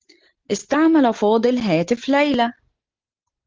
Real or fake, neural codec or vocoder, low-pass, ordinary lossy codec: real; none; 7.2 kHz; Opus, 16 kbps